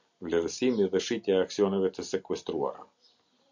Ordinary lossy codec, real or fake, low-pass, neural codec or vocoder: MP3, 64 kbps; real; 7.2 kHz; none